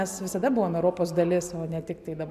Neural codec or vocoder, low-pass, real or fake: none; 14.4 kHz; real